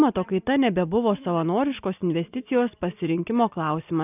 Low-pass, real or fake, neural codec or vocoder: 3.6 kHz; real; none